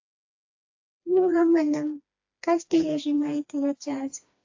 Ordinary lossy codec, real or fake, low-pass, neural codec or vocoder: AAC, 48 kbps; fake; 7.2 kHz; codec, 16 kHz, 2 kbps, FreqCodec, smaller model